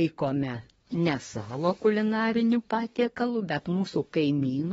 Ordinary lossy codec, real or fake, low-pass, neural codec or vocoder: AAC, 24 kbps; fake; 10.8 kHz; codec, 24 kHz, 1 kbps, SNAC